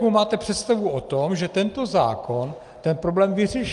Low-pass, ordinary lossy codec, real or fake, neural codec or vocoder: 10.8 kHz; Opus, 32 kbps; real; none